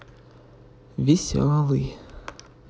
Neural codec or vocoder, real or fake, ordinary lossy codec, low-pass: none; real; none; none